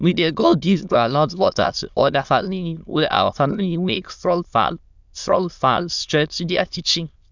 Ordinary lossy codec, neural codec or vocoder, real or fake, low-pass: none; autoencoder, 22.05 kHz, a latent of 192 numbers a frame, VITS, trained on many speakers; fake; 7.2 kHz